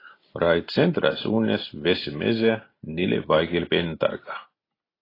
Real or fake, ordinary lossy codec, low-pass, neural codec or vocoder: real; AAC, 24 kbps; 5.4 kHz; none